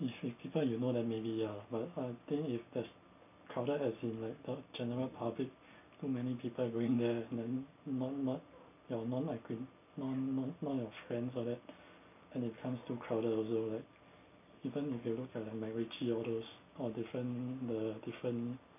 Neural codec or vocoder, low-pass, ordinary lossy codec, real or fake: none; 3.6 kHz; none; real